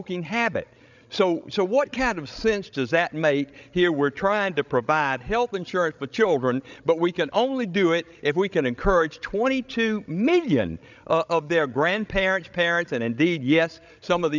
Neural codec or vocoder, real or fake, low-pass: codec, 16 kHz, 16 kbps, FreqCodec, larger model; fake; 7.2 kHz